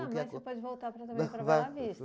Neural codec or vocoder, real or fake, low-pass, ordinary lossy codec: none; real; none; none